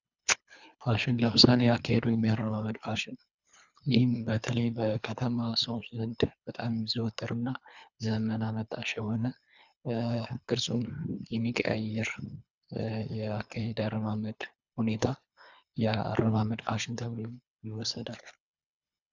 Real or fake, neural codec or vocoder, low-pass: fake; codec, 24 kHz, 3 kbps, HILCodec; 7.2 kHz